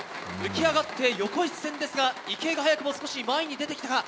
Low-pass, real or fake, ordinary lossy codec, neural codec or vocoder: none; real; none; none